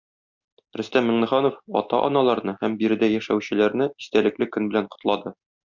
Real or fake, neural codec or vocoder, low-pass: real; none; 7.2 kHz